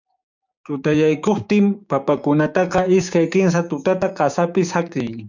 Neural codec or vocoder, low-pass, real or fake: codec, 16 kHz, 6 kbps, DAC; 7.2 kHz; fake